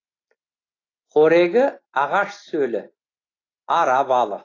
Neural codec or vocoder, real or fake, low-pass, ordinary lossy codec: none; real; 7.2 kHz; AAC, 32 kbps